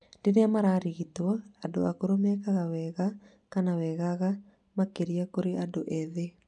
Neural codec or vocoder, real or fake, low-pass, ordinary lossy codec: none; real; 9.9 kHz; none